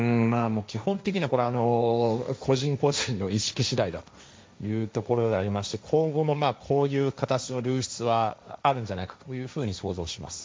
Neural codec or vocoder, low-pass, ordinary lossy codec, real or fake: codec, 16 kHz, 1.1 kbps, Voila-Tokenizer; none; none; fake